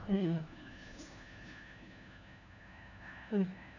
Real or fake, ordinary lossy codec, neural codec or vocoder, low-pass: fake; none; codec, 16 kHz, 1 kbps, FunCodec, trained on LibriTTS, 50 frames a second; 7.2 kHz